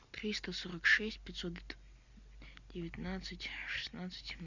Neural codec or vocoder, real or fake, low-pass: none; real; 7.2 kHz